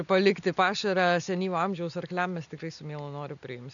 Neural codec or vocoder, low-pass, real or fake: none; 7.2 kHz; real